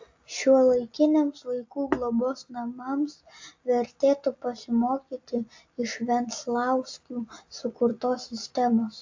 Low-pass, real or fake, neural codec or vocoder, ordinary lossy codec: 7.2 kHz; real; none; AAC, 48 kbps